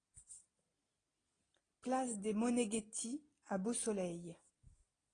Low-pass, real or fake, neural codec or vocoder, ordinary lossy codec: 9.9 kHz; real; none; AAC, 32 kbps